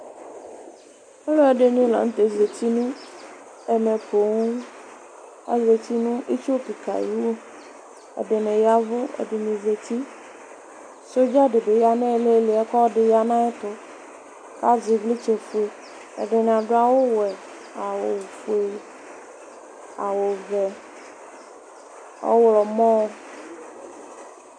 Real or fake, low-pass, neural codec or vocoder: real; 9.9 kHz; none